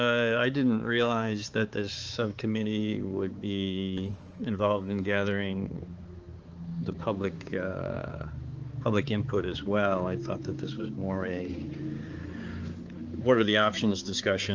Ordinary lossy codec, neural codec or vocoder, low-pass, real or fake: Opus, 24 kbps; codec, 16 kHz, 4 kbps, X-Codec, HuBERT features, trained on balanced general audio; 7.2 kHz; fake